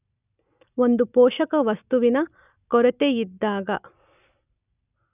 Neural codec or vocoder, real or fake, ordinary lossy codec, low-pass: none; real; none; 3.6 kHz